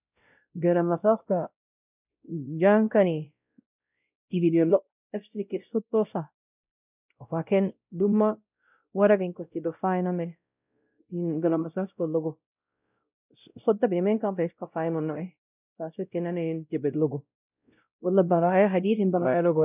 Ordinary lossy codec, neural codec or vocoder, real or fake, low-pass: none; codec, 16 kHz, 0.5 kbps, X-Codec, WavLM features, trained on Multilingual LibriSpeech; fake; 3.6 kHz